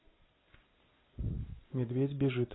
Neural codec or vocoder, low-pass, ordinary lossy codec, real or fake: none; 7.2 kHz; AAC, 16 kbps; real